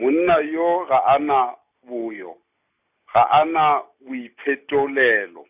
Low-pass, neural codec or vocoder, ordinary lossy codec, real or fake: 3.6 kHz; none; none; real